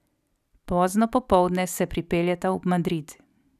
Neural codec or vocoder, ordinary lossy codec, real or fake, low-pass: none; none; real; 14.4 kHz